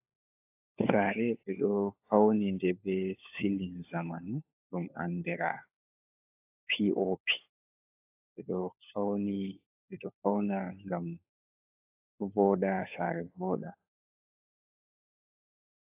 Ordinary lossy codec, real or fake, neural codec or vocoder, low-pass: AAC, 32 kbps; fake; codec, 16 kHz, 4 kbps, FunCodec, trained on LibriTTS, 50 frames a second; 3.6 kHz